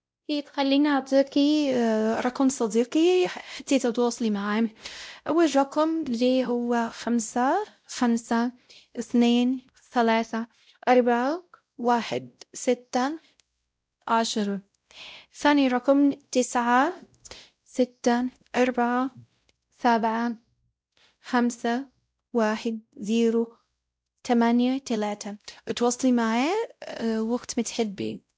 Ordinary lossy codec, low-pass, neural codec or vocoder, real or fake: none; none; codec, 16 kHz, 0.5 kbps, X-Codec, WavLM features, trained on Multilingual LibriSpeech; fake